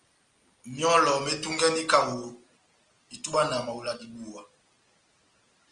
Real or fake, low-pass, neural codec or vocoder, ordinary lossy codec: real; 9.9 kHz; none; Opus, 24 kbps